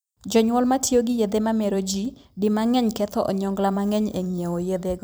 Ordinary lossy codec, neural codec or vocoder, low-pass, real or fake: none; vocoder, 44.1 kHz, 128 mel bands every 256 samples, BigVGAN v2; none; fake